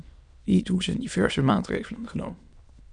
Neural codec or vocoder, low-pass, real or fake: autoencoder, 22.05 kHz, a latent of 192 numbers a frame, VITS, trained on many speakers; 9.9 kHz; fake